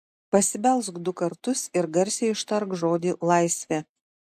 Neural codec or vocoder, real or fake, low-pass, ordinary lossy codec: none; real; 14.4 kHz; AAC, 96 kbps